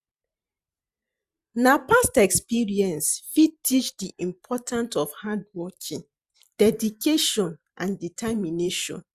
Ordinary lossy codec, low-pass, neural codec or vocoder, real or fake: Opus, 64 kbps; 14.4 kHz; vocoder, 44.1 kHz, 128 mel bands every 512 samples, BigVGAN v2; fake